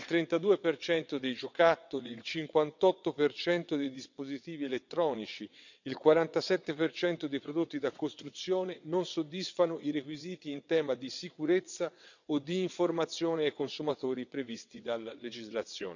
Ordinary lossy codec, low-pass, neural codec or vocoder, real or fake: none; 7.2 kHz; vocoder, 22.05 kHz, 80 mel bands, WaveNeXt; fake